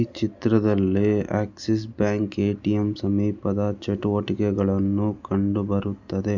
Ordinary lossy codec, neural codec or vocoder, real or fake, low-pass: none; none; real; 7.2 kHz